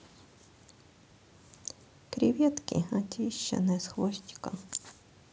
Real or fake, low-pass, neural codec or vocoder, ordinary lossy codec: real; none; none; none